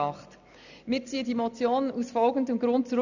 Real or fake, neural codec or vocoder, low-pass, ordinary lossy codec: real; none; 7.2 kHz; none